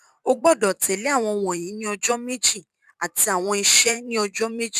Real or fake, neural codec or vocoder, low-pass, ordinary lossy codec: fake; vocoder, 44.1 kHz, 128 mel bands every 512 samples, BigVGAN v2; 14.4 kHz; none